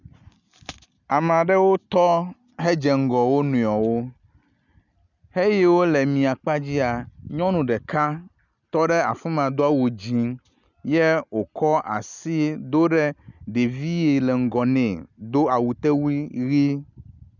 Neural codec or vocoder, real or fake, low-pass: none; real; 7.2 kHz